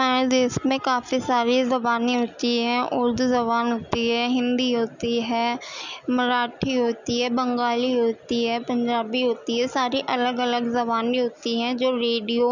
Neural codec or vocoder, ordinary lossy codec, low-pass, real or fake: none; none; 7.2 kHz; real